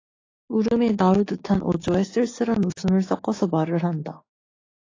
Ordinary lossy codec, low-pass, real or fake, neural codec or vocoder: AAC, 32 kbps; 7.2 kHz; fake; codec, 16 kHz, 6 kbps, DAC